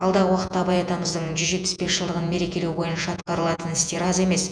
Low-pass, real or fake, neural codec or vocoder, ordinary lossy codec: 9.9 kHz; fake; vocoder, 48 kHz, 128 mel bands, Vocos; none